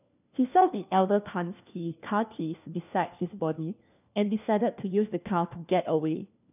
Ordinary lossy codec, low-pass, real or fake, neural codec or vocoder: none; 3.6 kHz; fake; codec, 16 kHz, 1 kbps, FunCodec, trained on LibriTTS, 50 frames a second